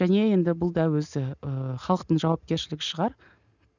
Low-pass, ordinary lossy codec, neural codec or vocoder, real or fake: 7.2 kHz; none; none; real